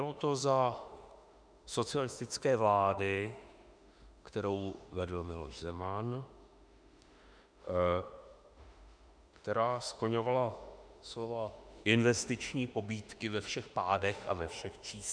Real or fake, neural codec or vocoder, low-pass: fake; autoencoder, 48 kHz, 32 numbers a frame, DAC-VAE, trained on Japanese speech; 9.9 kHz